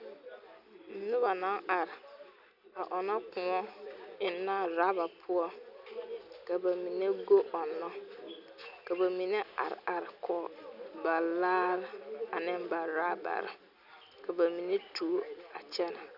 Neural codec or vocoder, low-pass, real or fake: none; 5.4 kHz; real